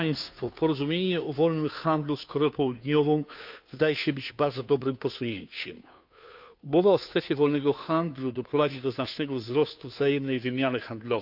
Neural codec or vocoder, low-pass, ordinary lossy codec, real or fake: codec, 16 kHz, 2 kbps, FunCodec, trained on Chinese and English, 25 frames a second; 5.4 kHz; none; fake